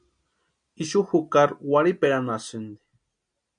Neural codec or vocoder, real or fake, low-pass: none; real; 9.9 kHz